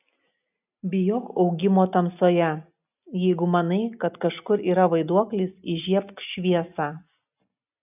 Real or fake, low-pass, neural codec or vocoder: real; 3.6 kHz; none